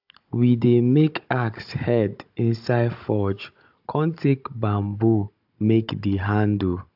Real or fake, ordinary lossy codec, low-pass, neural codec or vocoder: fake; AAC, 48 kbps; 5.4 kHz; codec, 16 kHz, 16 kbps, FunCodec, trained on Chinese and English, 50 frames a second